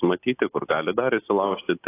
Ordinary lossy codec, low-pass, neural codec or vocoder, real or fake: AAC, 16 kbps; 3.6 kHz; none; real